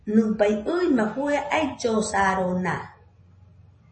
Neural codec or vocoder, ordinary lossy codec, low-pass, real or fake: none; MP3, 32 kbps; 9.9 kHz; real